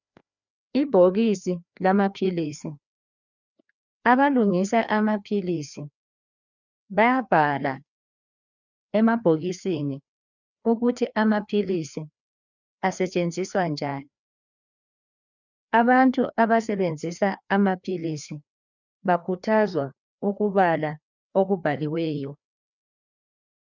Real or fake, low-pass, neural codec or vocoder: fake; 7.2 kHz; codec, 16 kHz, 2 kbps, FreqCodec, larger model